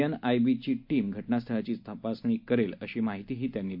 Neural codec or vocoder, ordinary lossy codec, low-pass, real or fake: none; AAC, 48 kbps; 5.4 kHz; real